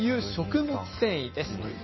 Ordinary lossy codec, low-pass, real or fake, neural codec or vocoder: MP3, 24 kbps; 7.2 kHz; real; none